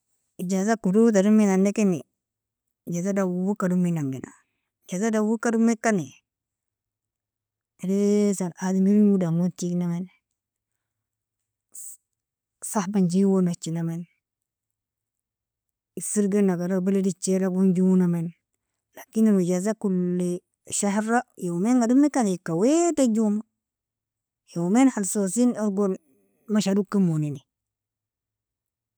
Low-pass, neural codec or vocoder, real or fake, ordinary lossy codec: none; none; real; none